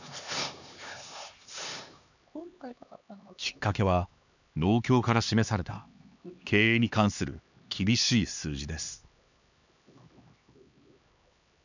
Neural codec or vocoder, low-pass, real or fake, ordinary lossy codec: codec, 16 kHz, 2 kbps, X-Codec, HuBERT features, trained on LibriSpeech; 7.2 kHz; fake; none